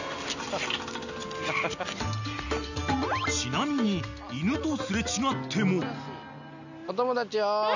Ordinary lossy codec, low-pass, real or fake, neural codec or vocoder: none; 7.2 kHz; real; none